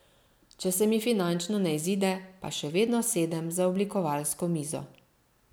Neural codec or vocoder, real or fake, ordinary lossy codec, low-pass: none; real; none; none